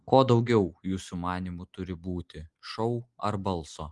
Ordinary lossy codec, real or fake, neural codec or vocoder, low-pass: Opus, 24 kbps; real; none; 10.8 kHz